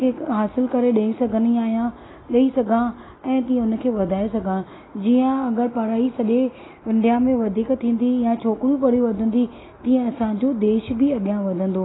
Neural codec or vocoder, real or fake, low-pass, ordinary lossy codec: none; real; 7.2 kHz; AAC, 16 kbps